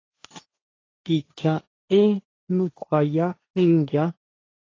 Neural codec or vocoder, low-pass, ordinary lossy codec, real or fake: codec, 16 kHz, 1.1 kbps, Voila-Tokenizer; 7.2 kHz; AAC, 32 kbps; fake